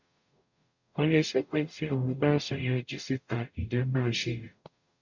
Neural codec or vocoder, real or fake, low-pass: codec, 44.1 kHz, 0.9 kbps, DAC; fake; 7.2 kHz